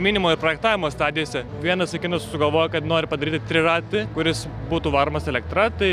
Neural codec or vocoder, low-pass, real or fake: none; 14.4 kHz; real